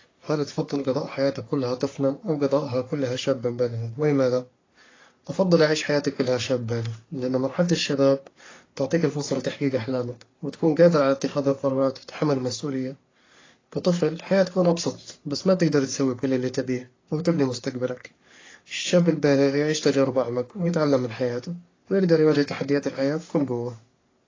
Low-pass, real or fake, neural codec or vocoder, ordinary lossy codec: 7.2 kHz; fake; codec, 44.1 kHz, 3.4 kbps, Pupu-Codec; AAC, 32 kbps